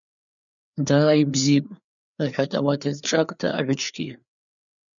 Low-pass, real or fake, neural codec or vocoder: 7.2 kHz; fake; codec, 16 kHz, 4 kbps, FreqCodec, larger model